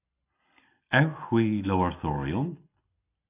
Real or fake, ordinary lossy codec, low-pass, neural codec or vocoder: real; AAC, 24 kbps; 3.6 kHz; none